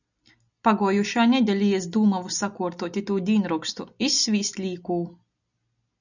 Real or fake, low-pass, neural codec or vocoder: real; 7.2 kHz; none